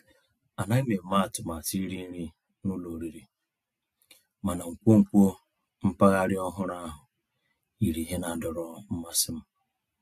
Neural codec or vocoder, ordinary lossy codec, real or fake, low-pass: none; AAC, 64 kbps; real; 14.4 kHz